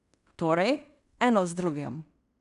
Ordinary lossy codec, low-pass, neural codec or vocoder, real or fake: none; 10.8 kHz; codec, 16 kHz in and 24 kHz out, 0.9 kbps, LongCat-Audio-Codec, fine tuned four codebook decoder; fake